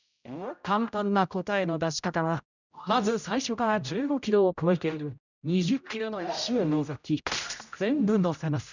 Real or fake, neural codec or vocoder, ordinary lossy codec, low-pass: fake; codec, 16 kHz, 0.5 kbps, X-Codec, HuBERT features, trained on general audio; none; 7.2 kHz